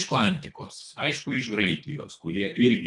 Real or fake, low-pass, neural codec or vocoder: fake; 10.8 kHz; codec, 24 kHz, 1.5 kbps, HILCodec